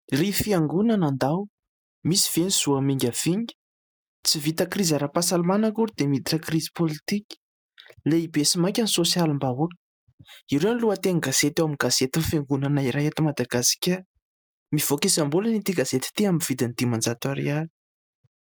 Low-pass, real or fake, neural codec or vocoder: 19.8 kHz; real; none